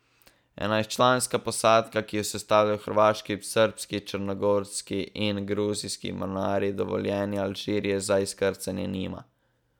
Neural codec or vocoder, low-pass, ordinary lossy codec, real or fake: none; 19.8 kHz; none; real